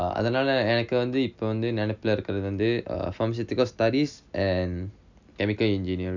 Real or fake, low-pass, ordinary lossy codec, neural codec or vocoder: real; 7.2 kHz; none; none